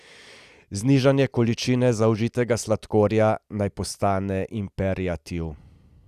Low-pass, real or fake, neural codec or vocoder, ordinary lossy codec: 14.4 kHz; real; none; Opus, 64 kbps